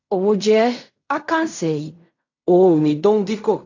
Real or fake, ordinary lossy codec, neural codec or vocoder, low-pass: fake; AAC, 48 kbps; codec, 16 kHz in and 24 kHz out, 0.4 kbps, LongCat-Audio-Codec, fine tuned four codebook decoder; 7.2 kHz